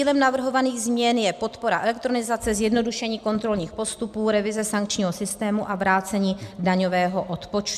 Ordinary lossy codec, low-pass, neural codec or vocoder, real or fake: MP3, 96 kbps; 14.4 kHz; none; real